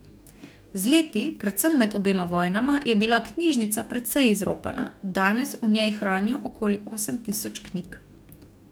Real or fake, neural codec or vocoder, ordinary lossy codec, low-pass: fake; codec, 44.1 kHz, 2.6 kbps, DAC; none; none